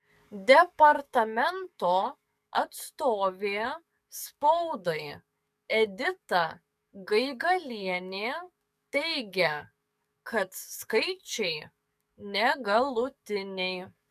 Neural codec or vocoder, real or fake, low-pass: codec, 44.1 kHz, 7.8 kbps, DAC; fake; 14.4 kHz